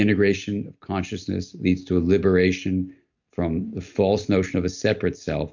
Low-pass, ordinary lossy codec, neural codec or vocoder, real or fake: 7.2 kHz; MP3, 64 kbps; none; real